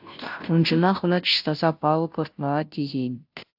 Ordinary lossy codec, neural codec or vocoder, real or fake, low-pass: AAC, 48 kbps; codec, 16 kHz, 0.5 kbps, FunCodec, trained on Chinese and English, 25 frames a second; fake; 5.4 kHz